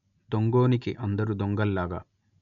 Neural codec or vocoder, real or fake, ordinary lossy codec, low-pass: none; real; none; 7.2 kHz